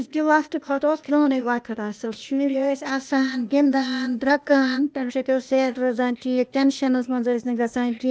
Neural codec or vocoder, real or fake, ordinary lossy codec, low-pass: codec, 16 kHz, 0.8 kbps, ZipCodec; fake; none; none